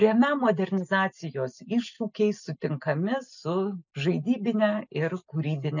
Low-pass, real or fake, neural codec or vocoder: 7.2 kHz; real; none